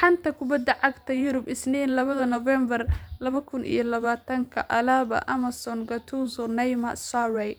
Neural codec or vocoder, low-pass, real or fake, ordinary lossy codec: vocoder, 44.1 kHz, 128 mel bands every 512 samples, BigVGAN v2; none; fake; none